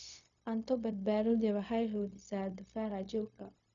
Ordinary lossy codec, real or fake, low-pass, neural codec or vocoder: none; fake; 7.2 kHz; codec, 16 kHz, 0.4 kbps, LongCat-Audio-Codec